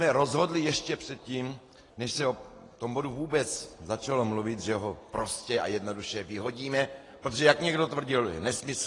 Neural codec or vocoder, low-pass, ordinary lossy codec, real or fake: none; 10.8 kHz; AAC, 32 kbps; real